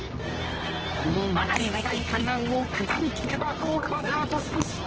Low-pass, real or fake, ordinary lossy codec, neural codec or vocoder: 7.2 kHz; fake; Opus, 16 kbps; codec, 24 kHz, 0.9 kbps, WavTokenizer, medium music audio release